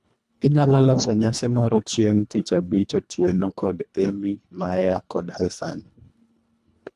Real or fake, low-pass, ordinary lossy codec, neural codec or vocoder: fake; none; none; codec, 24 kHz, 1.5 kbps, HILCodec